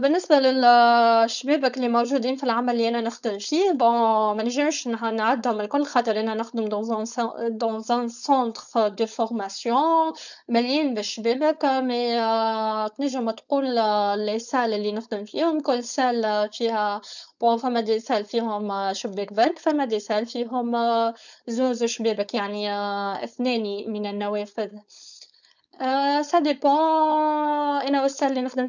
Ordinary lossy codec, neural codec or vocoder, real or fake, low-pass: none; codec, 16 kHz, 4.8 kbps, FACodec; fake; 7.2 kHz